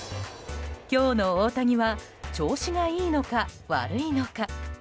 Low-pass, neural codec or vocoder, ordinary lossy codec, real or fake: none; none; none; real